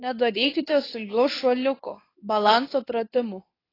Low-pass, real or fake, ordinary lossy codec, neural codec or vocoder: 5.4 kHz; fake; AAC, 24 kbps; codec, 24 kHz, 0.9 kbps, WavTokenizer, medium speech release version 2